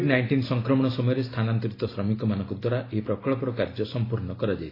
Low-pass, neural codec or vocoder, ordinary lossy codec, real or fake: 5.4 kHz; none; AAC, 24 kbps; real